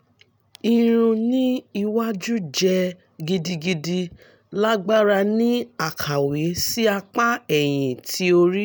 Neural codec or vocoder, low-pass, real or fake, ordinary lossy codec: none; none; real; none